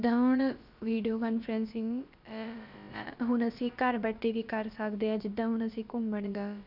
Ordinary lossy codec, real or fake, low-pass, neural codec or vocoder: none; fake; 5.4 kHz; codec, 16 kHz, about 1 kbps, DyCAST, with the encoder's durations